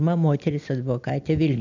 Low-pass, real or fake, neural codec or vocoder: 7.2 kHz; real; none